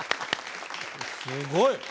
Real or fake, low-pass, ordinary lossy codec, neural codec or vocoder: real; none; none; none